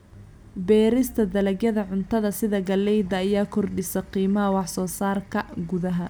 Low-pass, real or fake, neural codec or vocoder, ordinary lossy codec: none; real; none; none